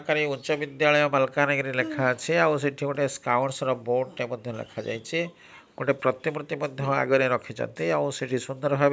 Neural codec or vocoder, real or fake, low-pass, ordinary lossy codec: none; real; none; none